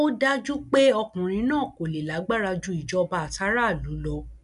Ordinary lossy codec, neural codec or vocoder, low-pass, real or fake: none; none; 10.8 kHz; real